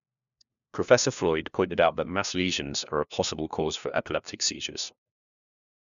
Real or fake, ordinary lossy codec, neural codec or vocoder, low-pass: fake; none; codec, 16 kHz, 1 kbps, FunCodec, trained on LibriTTS, 50 frames a second; 7.2 kHz